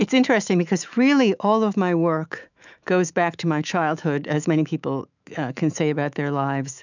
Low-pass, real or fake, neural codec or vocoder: 7.2 kHz; fake; autoencoder, 48 kHz, 128 numbers a frame, DAC-VAE, trained on Japanese speech